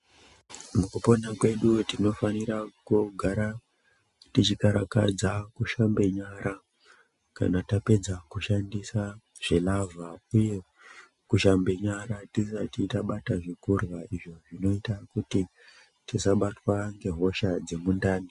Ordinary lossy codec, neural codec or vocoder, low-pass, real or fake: MP3, 96 kbps; none; 10.8 kHz; real